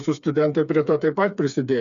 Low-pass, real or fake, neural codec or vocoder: 7.2 kHz; fake; codec, 16 kHz, 4 kbps, FreqCodec, smaller model